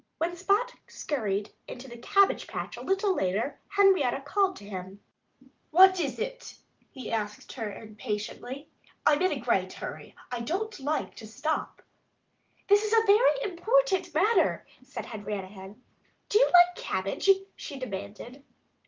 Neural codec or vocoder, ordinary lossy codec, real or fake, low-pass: none; Opus, 32 kbps; real; 7.2 kHz